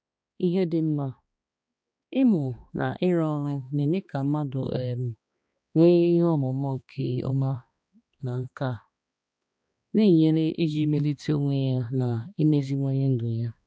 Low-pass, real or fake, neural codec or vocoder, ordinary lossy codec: none; fake; codec, 16 kHz, 2 kbps, X-Codec, HuBERT features, trained on balanced general audio; none